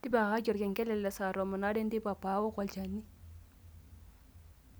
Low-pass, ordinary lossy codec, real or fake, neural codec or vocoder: none; none; real; none